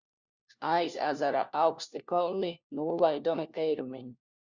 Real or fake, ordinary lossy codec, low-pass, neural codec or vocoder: fake; Opus, 64 kbps; 7.2 kHz; codec, 16 kHz, 1 kbps, FunCodec, trained on LibriTTS, 50 frames a second